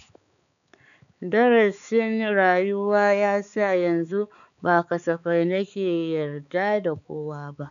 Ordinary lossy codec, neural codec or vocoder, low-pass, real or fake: none; codec, 16 kHz, 4 kbps, X-Codec, HuBERT features, trained on balanced general audio; 7.2 kHz; fake